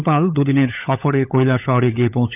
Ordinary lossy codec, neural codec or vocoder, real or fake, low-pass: AAC, 32 kbps; codec, 16 kHz, 16 kbps, FunCodec, trained on LibriTTS, 50 frames a second; fake; 3.6 kHz